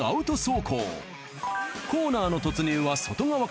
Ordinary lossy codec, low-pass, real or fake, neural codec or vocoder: none; none; real; none